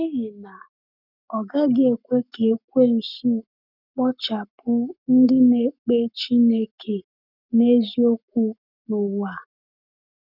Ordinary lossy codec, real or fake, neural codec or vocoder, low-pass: none; fake; codec, 44.1 kHz, 7.8 kbps, Pupu-Codec; 5.4 kHz